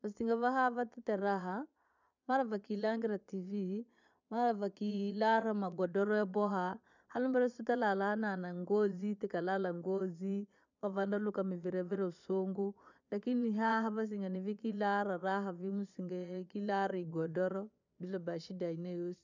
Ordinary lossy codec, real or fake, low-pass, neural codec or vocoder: none; fake; 7.2 kHz; vocoder, 22.05 kHz, 80 mel bands, Vocos